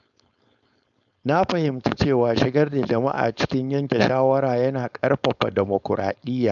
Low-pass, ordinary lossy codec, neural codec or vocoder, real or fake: 7.2 kHz; none; codec, 16 kHz, 4.8 kbps, FACodec; fake